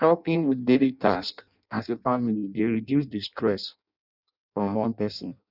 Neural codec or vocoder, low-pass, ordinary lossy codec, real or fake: codec, 16 kHz in and 24 kHz out, 0.6 kbps, FireRedTTS-2 codec; 5.4 kHz; none; fake